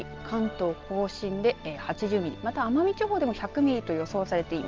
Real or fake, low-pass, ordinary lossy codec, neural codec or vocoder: real; 7.2 kHz; Opus, 32 kbps; none